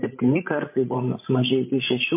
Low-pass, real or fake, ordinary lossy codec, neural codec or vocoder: 3.6 kHz; fake; MP3, 24 kbps; codec, 16 kHz, 16 kbps, FreqCodec, larger model